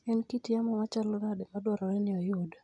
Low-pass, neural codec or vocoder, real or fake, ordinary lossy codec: 10.8 kHz; none; real; none